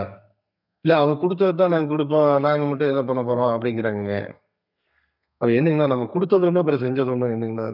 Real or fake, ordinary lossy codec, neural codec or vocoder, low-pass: fake; none; codec, 32 kHz, 1.9 kbps, SNAC; 5.4 kHz